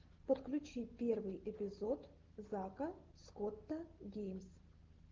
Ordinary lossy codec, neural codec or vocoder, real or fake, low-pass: Opus, 16 kbps; none; real; 7.2 kHz